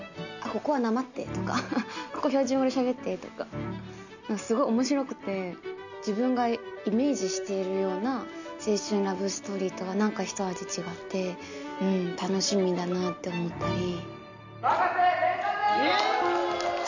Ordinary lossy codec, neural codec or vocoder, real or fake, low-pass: none; none; real; 7.2 kHz